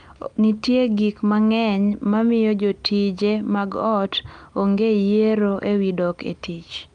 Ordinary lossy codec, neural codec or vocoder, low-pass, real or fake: Opus, 32 kbps; none; 9.9 kHz; real